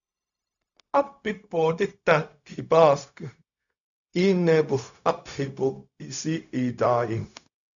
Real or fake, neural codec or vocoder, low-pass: fake; codec, 16 kHz, 0.4 kbps, LongCat-Audio-Codec; 7.2 kHz